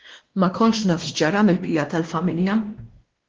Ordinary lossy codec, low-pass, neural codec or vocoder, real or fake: Opus, 16 kbps; 7.2 kHz; codec, 16 kHz, 1 kbps, X-Codec, WavLM features, trained on Multilingual LibriSpeech; fake